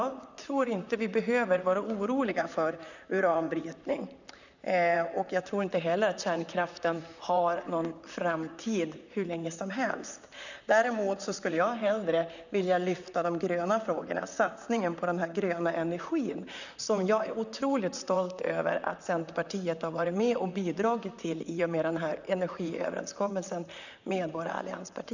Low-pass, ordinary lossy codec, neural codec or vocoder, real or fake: 7.2 kHz; none; vocoder, 44.1 kHz, 128 mel bands, Pupu-Vocoder; fake